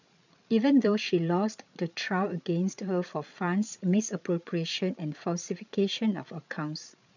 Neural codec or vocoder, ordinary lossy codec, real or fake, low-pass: codec, 16 kHz, 8 kbps, FreqCodec, larger model; none; fake; 7.2 kHz